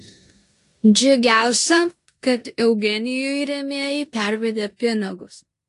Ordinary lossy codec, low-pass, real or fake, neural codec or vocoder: AAC, 48 kbps; 10.8 kHz; fake; codec, 16 kHz in and 24 kHz out, 0.9 kbps, LongCat-Audio-Codec, four codebook decoder